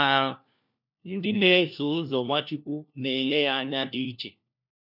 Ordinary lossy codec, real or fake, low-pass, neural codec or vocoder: none; fake; 5.4 kHz; codec, 16 kHz, 1 kbps, FunCodec, trained on LibriTTS, 50 frames a second